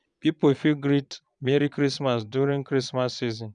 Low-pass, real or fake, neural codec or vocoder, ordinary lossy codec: 9.9 kHz; fake; vocoder, 22.05 kHz, 80 mel bands, Vocos; none